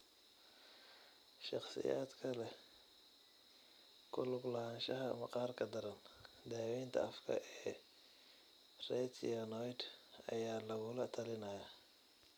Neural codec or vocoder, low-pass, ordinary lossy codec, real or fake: none; none; none; real